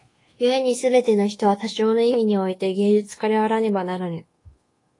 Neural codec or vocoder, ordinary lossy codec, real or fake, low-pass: codec, 24 kHz, 1.2 kbps, DualCodec; AAC, 32 kbps; fake; 10.8 kHz